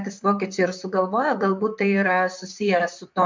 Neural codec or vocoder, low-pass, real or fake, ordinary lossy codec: vocoder, 44.1 kHz, 128 mel bands, Pupu-Vocoder; 7.2 kHz; fake; MP3, 64 kbps